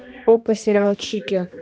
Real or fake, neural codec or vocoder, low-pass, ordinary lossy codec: fake; codec, 16 kHz, 1 kbps, X-Codec, HuBERT features, trained on balanced general audio; none; none